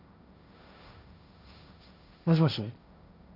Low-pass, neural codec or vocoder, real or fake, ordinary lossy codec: 5.4 kHz; codec, 16 kHz, 1.1 kbps, Voila-Tokenizer; fake; none